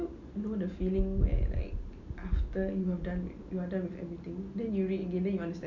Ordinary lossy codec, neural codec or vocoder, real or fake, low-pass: none; none; real; 7.2 kHz